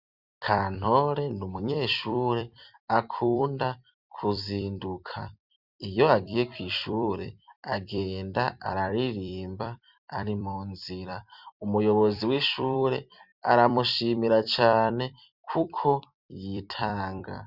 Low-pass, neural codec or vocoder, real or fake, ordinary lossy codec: 5.4 kHz; vocoder, 44.1 kHz, 128 mel bands every 256 samples, BigVGAN v2; fake; Opus, 64 kbps